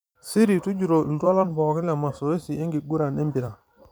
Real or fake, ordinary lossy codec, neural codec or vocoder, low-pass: fake; none; vocoder, 44.1 kHz, 128 mel bands every 256 samples, BigVGAN v2; none